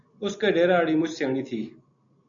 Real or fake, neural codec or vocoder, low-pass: real; none; 7.2 kHz